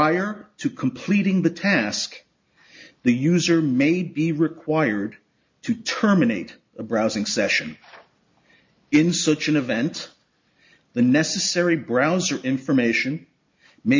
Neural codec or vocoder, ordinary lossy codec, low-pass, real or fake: none; MP3, 48 kbps; 7.2 kHz; real